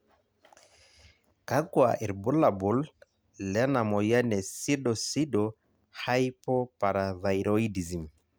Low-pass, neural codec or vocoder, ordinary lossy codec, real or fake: none; none; none; real